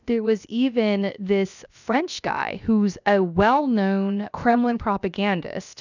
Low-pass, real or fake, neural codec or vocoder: 7.2 kHz; fake; codec, 16 kHz, about 1 kbps, DyCAST, with the encoder's durations